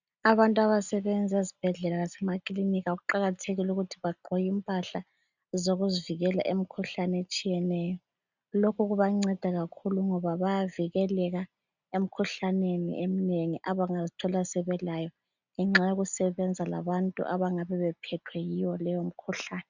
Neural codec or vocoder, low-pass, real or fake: none; 7.2 kHz; real